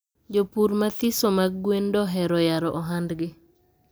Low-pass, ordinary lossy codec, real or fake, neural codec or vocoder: none; none; real; none